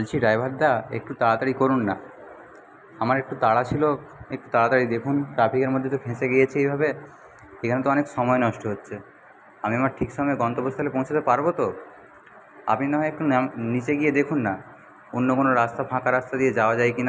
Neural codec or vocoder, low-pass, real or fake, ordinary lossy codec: none; none; real; none